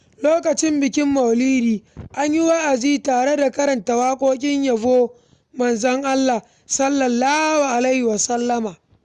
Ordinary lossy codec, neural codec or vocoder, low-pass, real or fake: Opus, 64 kbps; none; 10.8 kHz; real